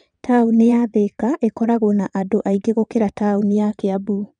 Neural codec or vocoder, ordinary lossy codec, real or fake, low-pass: vocoder, 22.05 kHz, 80 mel bands, WaveNeXt; none; fake; 9.9 kHz